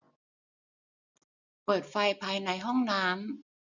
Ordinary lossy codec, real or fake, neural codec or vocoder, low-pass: none; real; none; 7.2 kHz